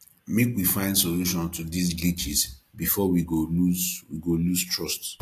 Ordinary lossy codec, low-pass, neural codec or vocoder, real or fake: AAC, 64 kbps; 14.4 kHz; none; real